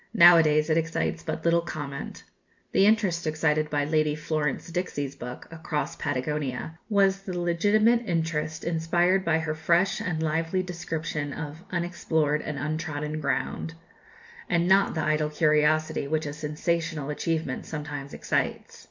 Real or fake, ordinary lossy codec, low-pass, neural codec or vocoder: real; MP3, 64 kbps; 7.2 kHz; none